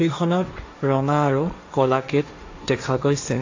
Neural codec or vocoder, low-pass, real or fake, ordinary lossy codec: codec, 16 kHz, 1.1 kbps, Voila-Tokenizer; 7.2 kHz; fake; AAC, 48 kbps